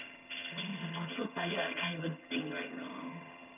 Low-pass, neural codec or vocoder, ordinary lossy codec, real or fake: 3.6 kHz; vocoder, 22.05 kHz, 80 mel bands, HiFi-GAN; none; fake